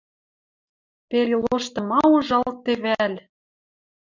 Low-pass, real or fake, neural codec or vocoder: 7.2 kHz; real; none